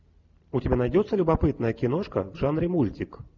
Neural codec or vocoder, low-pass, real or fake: none; 7.2 kHz; real